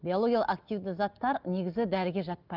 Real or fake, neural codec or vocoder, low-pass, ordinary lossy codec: real; none; 5.4 kHz; Opus, 32 kbps